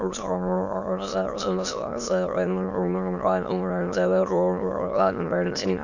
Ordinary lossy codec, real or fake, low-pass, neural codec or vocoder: none; fake; 7.2 kHz; autoencoder, 22.05 kHz, a latent of 192 numbers a frame, VITS, trained on many speakers